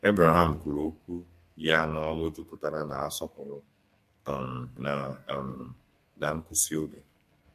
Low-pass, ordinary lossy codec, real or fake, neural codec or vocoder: 14.4 kHz; MP3, 64 kbps; fake; codec, 32 kHz, 1.9 kbps, SNAC